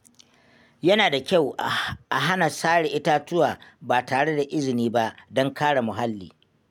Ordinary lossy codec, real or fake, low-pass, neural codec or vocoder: none; real; none; none